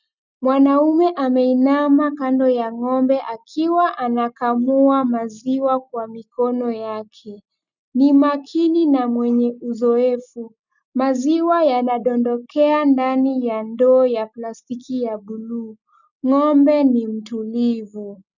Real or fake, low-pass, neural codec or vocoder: real; 7.2 kHz; none